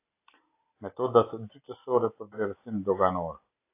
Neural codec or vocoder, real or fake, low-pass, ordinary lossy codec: none; real; 3.6 kHz; AAC, 24 kbps